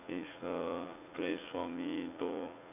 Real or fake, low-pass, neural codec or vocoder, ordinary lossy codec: fake; 3.6 kHz; vocoder, 44.1 kHz, 80 mel bands, Vocos; AAC, 24 kbps